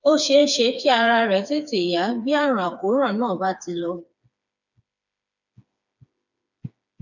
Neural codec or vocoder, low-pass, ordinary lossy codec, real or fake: codec, 16 kHz, 4 kbps, FreqCodec, smaller model; 7.2 kHz; none; fake